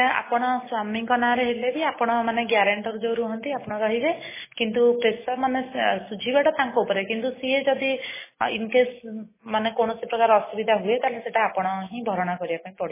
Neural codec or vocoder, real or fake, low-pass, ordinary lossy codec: none; real; 3.6 kHz; MP3, 16 kbps